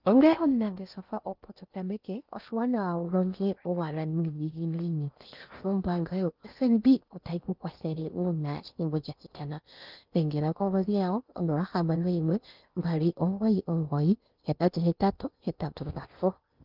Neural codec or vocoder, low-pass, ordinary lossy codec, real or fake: codec, 16 kHz in and 24 kHz out, 0.6 kbps, FocalCodec, streaming, 2048 codes; 5.4 kHz; Opus, 32 kbps; fake